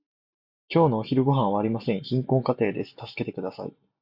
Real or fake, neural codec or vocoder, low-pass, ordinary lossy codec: real; none; 5.4 kHz; Opus, 64 kbps